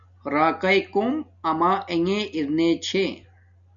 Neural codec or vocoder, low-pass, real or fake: none; 7.2 kHz; real